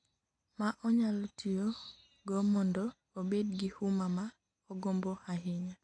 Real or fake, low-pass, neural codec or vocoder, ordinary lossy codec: real; 9.9 kHz; none; AAC, 64 kbps